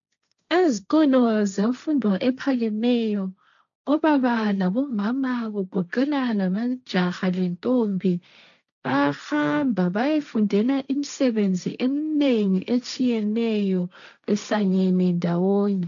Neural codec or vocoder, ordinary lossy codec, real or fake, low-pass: codec, 16 kHz, 1.1 kbps, Voila-Tokenizer; MP3, 96 kbps; fake; 7.2 kHz